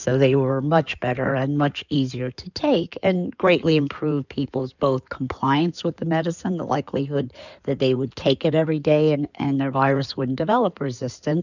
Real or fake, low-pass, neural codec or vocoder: fake; 7.2 kHz; codec, 16 kHz in and 24 kHz out, 2.2 kbps, FireRedTTS-2 codec